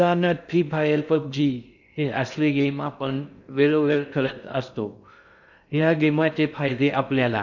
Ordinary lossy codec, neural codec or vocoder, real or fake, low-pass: none; codec, 16 kHz in and 24 kHz out, 0.6 kbps, FocalCodec, streaming, 2048 codes; fake; 7.2 kHz